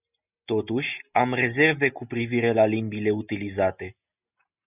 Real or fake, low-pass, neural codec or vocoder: real; 3.6 kHz; none